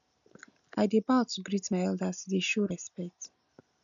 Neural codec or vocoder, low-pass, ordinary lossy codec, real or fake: none; 7.2 kHz; none; real